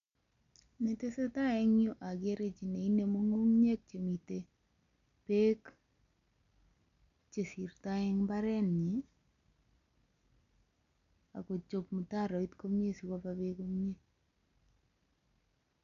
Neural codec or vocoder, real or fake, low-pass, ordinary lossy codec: none; real; 7.2 kHz; none